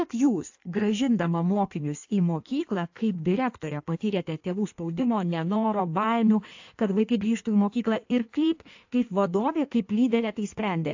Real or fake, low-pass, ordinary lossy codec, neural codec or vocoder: fake; 7.2 kHz; AAC, 48 kbps; codec, 16 kHz in and 24 kHz out, 1.1 kbps, FireRedTTS-2 codec